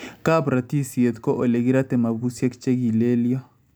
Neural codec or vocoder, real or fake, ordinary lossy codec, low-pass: none; real; none; none